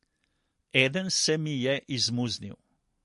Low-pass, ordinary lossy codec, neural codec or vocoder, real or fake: 14.4 kHz; MP3, 48 kbps; none; real